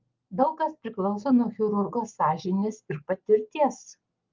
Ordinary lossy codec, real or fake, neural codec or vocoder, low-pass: Opus, 32 kbps; real; none; 7.2 kHz